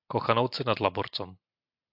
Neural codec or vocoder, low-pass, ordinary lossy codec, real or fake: none; 5.4 kHz; AAC, 48 kbps; real